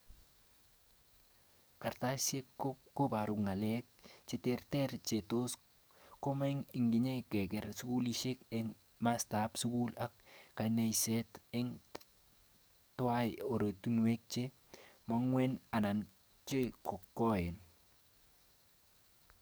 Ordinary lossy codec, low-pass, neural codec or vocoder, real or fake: none; none; codec, 44.1 kHz, 7.8 kbps, DAC; fake